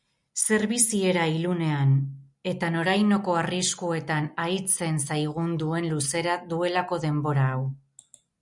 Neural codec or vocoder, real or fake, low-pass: none; real; 10.8 kHz